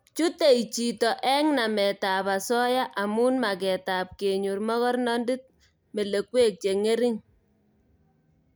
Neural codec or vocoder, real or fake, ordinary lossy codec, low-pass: none; real; none; none